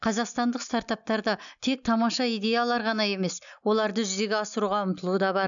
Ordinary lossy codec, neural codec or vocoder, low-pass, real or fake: none; none; 7.2 kHz; real